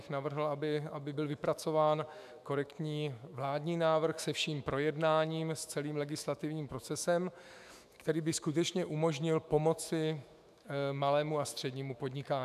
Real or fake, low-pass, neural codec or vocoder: fake; 14.4 kHz; autoencoder, 48 kHz, 128 numbers a frame, DAC-VAE, trained on Japanese speech